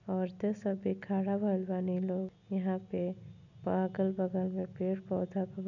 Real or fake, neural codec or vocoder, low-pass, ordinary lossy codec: real; none; 7.2 kHz; none